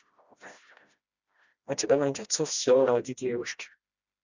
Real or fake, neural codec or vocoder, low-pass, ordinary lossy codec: fake; codec, 16 kHz, 1 kbps, FreqCodec, smaller model; 7.2 kHz; Opus, 64 kbps